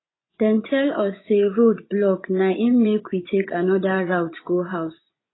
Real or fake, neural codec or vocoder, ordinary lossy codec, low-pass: fake; vocoder, 24 kHz, 100 mel bands, Vocos; AAC, 16 kbps; 7.2 kHz